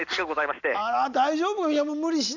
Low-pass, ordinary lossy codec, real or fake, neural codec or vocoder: 7.2 kHz; none; real; none